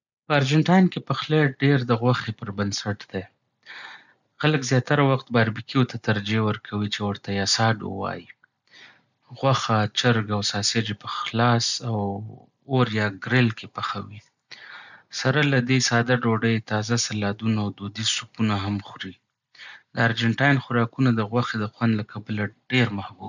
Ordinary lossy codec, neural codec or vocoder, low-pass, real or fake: none; none; 7.2 kHz; real